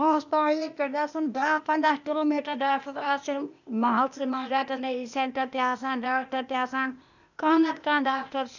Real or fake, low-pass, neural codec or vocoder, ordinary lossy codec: fake; 7.2 kHz; codec, 16 kHz, 0.8 kbps, ZipCodec; none